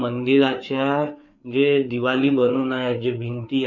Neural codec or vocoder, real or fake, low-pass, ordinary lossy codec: codec, 16 kHz, 4 kbps, FreqCodec, larger model; fake; 7.2 kHz; none